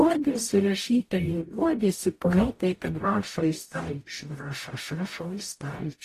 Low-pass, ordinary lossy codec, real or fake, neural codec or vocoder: 14.4 kHz; AAC, 48 kbps; fake; codec, 44.1 kHz, 0.9 kbps, DAC